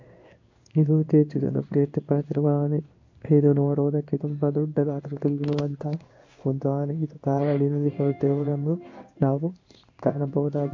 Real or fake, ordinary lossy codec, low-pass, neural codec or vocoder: fake; MP3, 48 kbps; 7.2 kHz; codec, 16 kHz in and 24 kHz out, 1 kbps, XY-Tokenizer